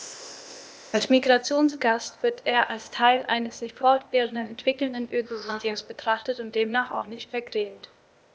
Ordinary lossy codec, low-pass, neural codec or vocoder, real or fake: none; none; codec, 16 kHz, 0.8 kbps, ZipCodec; fake